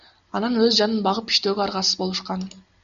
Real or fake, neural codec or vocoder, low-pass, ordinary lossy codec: real; none; 7.2 kHz; AAC, 96 kbps